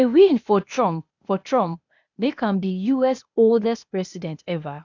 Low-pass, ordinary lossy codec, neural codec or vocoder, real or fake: 7.2 kHz; none; codec, 16 kHz, 0.8 kbps, ZipCodec; fake